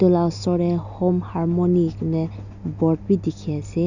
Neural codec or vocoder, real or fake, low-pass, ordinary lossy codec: none; real; 7.2 kHz; none